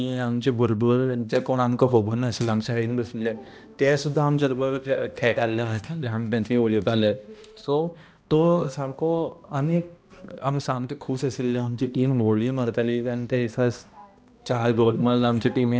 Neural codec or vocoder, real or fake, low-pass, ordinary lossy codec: codec, 16 kHz, 1 kbps, X-Codec, HuBERT features, trained on balanced general audio; fake; none; none